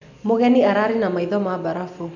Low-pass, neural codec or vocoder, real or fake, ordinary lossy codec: 7.2 kHz; none; real; none